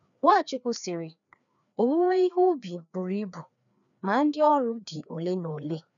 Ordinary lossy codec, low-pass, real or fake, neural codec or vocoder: none; 7.2 kHz; fake; codec, 16 kHz, 2 kbps, FreqCodec, larger model